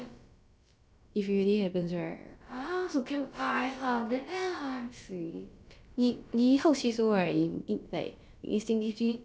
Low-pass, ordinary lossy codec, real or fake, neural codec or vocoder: none; none; fake; codec, 16 kHz, about 1 kbps, DyCAST, with the encoder's durations